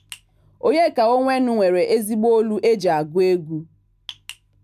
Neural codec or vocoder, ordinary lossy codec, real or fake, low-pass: none; none; real; 14.4 kHz